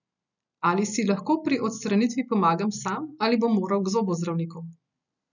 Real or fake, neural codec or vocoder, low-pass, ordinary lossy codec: real; none; 7.2 kHz; none